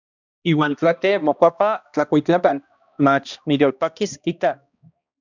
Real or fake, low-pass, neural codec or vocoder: fake; 7.2 kHz; codec, 16 kHz, 1 kbps, X-Codec, HuBERT features, trained on balanced general audio